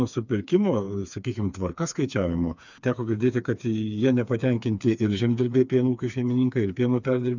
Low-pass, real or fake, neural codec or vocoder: 7.2 kHz; fake; codec, 16 kHz, 4 kbps, FreqCodec, smaller model